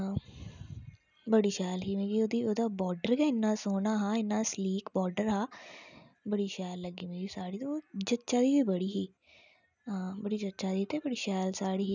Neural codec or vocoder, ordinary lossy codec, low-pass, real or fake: none; none; 7.2 kHz; real